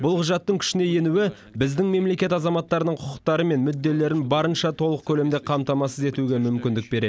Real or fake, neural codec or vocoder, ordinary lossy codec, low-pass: real; none; none; none